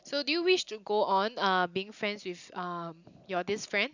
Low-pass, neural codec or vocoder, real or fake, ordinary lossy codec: 7.2 kHz; none; real; none